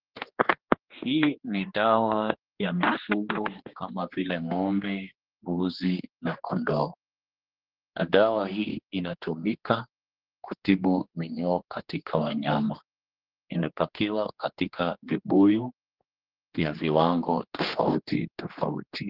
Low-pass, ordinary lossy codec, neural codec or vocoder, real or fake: 5.4 kHz; Opus, 16 kbps; codec, 16 kHz, 2 kbps, X-Codec, HuBERT features, trained on general audio; fake